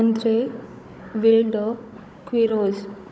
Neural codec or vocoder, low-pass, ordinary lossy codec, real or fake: codec, 16 kHz, 16 kbps, FunCodec, trained on Chinese and English, 50 frames a second; none; none; fake